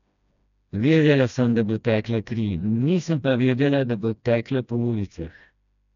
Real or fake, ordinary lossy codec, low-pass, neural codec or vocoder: fake; none; 7.2 kHz; codec, 16 kHz, 1 kbps, FreqCodec, smaller model